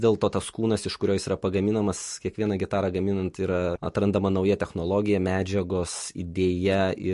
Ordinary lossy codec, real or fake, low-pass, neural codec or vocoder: MP3, 48 kbps; real; 14.4 kHz; none